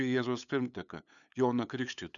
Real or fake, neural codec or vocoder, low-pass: fake; codec, 16 kHz, 8 kbps, FunCodec, trained on LibriTTS, 25 frames a second; 7.2 kHz